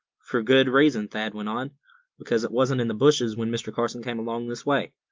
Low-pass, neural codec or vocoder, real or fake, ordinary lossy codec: 7.2 kHz; none; real; Opus, 32 kbps